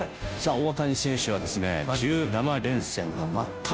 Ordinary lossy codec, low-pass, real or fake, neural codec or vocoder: none; none; fake; codec, 16 kHz, 0.5 kbps, FunCodec, trained on Chinese and English, 25 frames a second